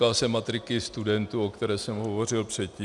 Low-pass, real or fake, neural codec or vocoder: 10.8 kHz; real; none